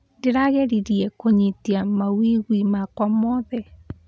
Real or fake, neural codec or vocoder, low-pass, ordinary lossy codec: real; none; none; none